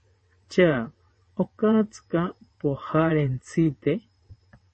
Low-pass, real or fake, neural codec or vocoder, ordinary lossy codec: 9.9 kHz; fake; vocoder, 22.05 kHz, 80 mel bands, WaveNeXt; MP3, 32 kbps